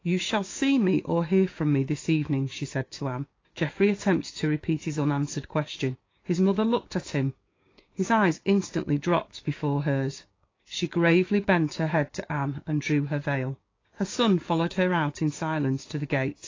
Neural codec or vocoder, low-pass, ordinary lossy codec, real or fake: codec, 16 kHz, 6 kbps, DAC; 7.2 kHz; AAC, 32 kbps; fake